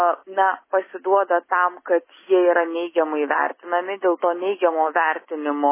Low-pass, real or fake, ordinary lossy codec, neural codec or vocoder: 3.6 kHz; real; MP3, 16 kbps; none